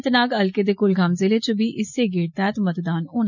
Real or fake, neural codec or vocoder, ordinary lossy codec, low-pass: real; none; none; 7.2 kHz